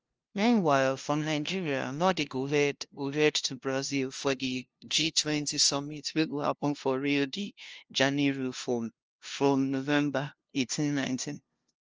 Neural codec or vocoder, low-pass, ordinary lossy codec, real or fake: codec, 16 kHz, 0.5 kbps, FunCodec, trained on LibriTTS, 25 frames a second; 7.2 kHz; Opus, 24 kbps; fake